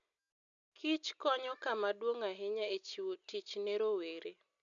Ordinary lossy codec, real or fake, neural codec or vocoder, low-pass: none; real; none; 7.2 kHz